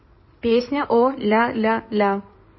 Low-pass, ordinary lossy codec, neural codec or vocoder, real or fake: 7.2 kHz; MP3, 24 kbps; codec, 16 kHz in and 24 kHz out, 2.2 kbps, FireRedTTS-2 codec; fake